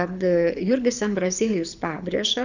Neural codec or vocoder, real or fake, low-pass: codec, 24 kHz, 6 kbps, HILCodec; fake; 7.2 kHz